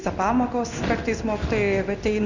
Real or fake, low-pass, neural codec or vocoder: fake; 7.2 kHz; codec, 16 kHz in and 24 kHz out, 1 kbps, XY-Tokenizer